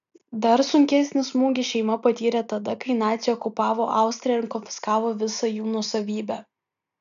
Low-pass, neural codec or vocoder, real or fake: 7.2 kHz; none; real